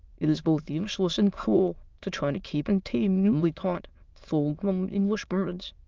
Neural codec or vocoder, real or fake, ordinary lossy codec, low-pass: autoencoder, 22.05 kHz, a latent of 192 numbers a frame, VITS, trained on many speakers; fake; Opus, 32 kbps; 7.2 kHz